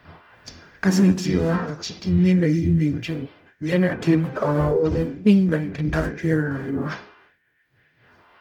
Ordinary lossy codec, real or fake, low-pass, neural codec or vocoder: none; fake; 19.8 kHz; codec, 44.1 kHz, 0.9 kbps, DAC